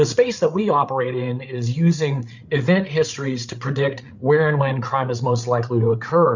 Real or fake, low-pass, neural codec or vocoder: fake; 7.2 kHz; codec, 16 kHz, 8 kbps, FreqCodec, larger model